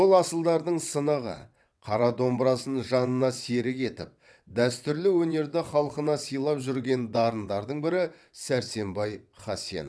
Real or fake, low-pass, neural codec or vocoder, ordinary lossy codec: fake; none; vocoder, 22.05 kHz, 80 mel bands, WaveNeXt; none